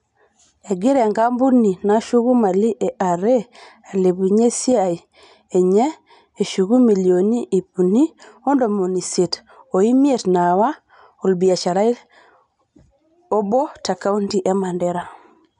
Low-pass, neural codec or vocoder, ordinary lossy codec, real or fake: 10.8 kHz; none; none; real